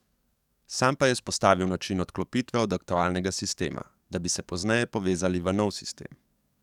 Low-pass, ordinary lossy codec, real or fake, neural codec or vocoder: 19.8 kHz; none; fake; codec, 44.1 kHz, 7.8 kbps, DAC